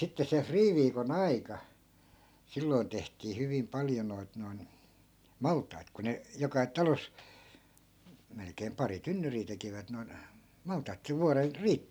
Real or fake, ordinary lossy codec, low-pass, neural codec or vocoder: real; none; none; none